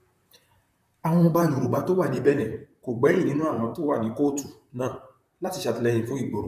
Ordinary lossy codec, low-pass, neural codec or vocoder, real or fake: none; 14.4 kHz; vocoder, 44.1 kHz, 128 mel bands, Pupu-Vocoder; fake